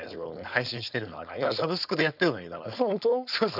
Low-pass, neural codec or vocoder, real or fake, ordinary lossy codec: 5.4 kHz; codec, 16 kHz, 4.8 kbps, FACodec; fake; none